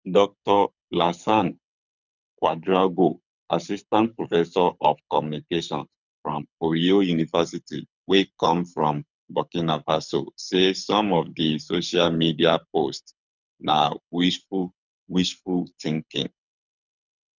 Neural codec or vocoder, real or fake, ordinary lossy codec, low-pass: codec, 24 kHz, 6 kbps, HILCodec; fake; none; 7.2 kHz